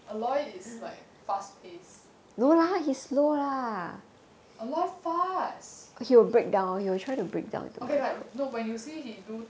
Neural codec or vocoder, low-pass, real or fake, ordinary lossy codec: none; none; real; none